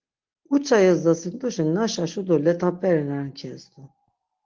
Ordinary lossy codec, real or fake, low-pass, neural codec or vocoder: Opus, 16 kbps; real; 7.2 kHz; none